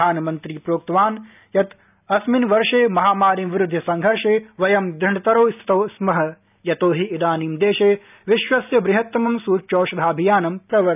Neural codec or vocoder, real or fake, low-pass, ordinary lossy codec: none; real; 3.6 kHz; none